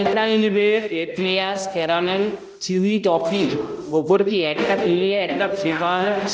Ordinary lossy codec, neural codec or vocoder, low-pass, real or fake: none; codec, 16 kHz, 0.5 kbps, X-Codec, HuBERT features, trained on balanced general audio; none; fake